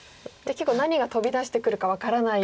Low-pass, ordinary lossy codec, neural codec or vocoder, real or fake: none; none; none; real